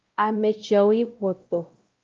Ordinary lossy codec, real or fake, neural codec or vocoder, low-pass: Opus, 32 kbps; fake; codec, 16 kHz, 0.5 kbps, X-Codec, WavLM features, trained on Multilingual LibriSpeech; 7.2 kHz